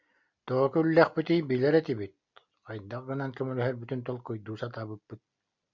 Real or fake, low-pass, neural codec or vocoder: real; 7.2 kHz; none